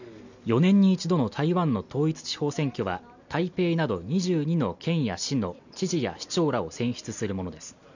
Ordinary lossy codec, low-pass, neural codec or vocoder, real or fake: none; 7.2 kHz; none; real